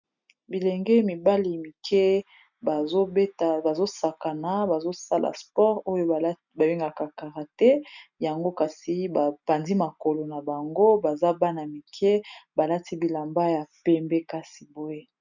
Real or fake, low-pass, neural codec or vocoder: real; 7.2 kHz; none